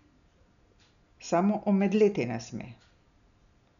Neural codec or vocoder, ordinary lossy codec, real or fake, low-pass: none; none; real; 7.2 kHz